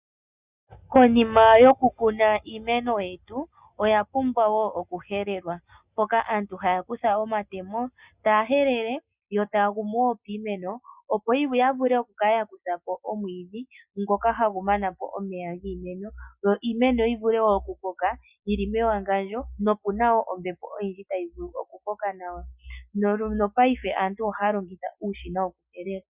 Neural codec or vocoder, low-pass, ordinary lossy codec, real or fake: none; 3.6 kHz; Opus, 64 kbps; real